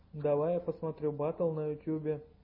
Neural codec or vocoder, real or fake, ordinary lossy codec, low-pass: none; real; MP3, 24 kbps; 5.4 kHz